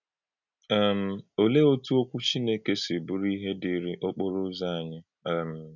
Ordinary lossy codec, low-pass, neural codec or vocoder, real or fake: none; 7.2 kHz; none; real